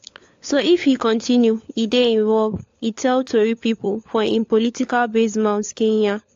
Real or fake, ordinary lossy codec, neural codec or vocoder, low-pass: fake; AAC, 48 kbps; codec, 16 kHz, 4 kbps, FunCodec, trained on LibriTTS, 50 frames a second; 7.2 kHz